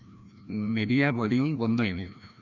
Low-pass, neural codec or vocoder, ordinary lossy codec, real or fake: 7.2 kHz; codec, 16 kHz, 1 kbps, FreqCodec, larger model; none; fake